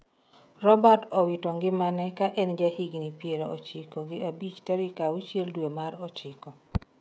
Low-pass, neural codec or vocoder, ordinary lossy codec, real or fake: none; codec, 16 kHz, 16 kbps, FreqCodec, smaller model; none; fake